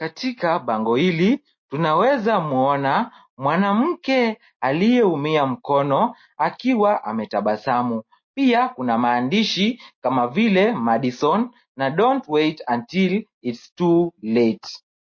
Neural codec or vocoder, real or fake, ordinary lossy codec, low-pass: none; real; MP3, 32 kbps; 7.2 kHz